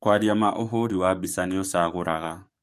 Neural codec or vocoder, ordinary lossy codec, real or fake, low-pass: codec, 44.1 kHz, 7.8 kbps, DAC; MP3, 64 kbps; fake; 14.4 kHz